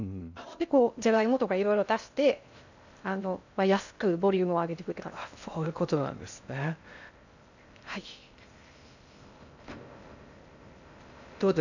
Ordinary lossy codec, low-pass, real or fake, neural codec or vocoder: none; 7.2 kHz; fake; codec, 16 kHz in and 24 kHz out, 0.6 kbps, FocalCodec, streaming, 2048 codes